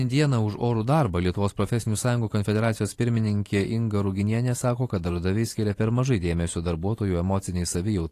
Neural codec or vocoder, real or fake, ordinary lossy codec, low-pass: vocoder, 44.1 kHz, 128 mel bands every 512 samples, BigVGAN v2; fake; AAC, 48 kbps; 14.4 kHz